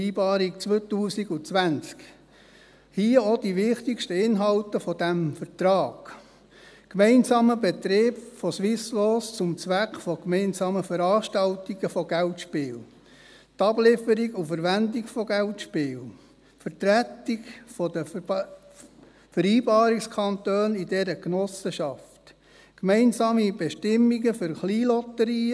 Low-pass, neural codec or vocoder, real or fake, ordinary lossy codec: none; none; real; none